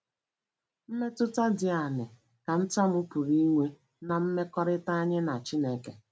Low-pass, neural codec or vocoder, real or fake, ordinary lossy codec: none; none; real; none